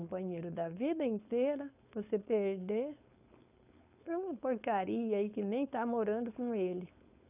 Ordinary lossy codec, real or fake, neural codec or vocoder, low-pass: none; fake; codec, 16 kHz, 4.8 kbps, FACodec; 3.6 kHz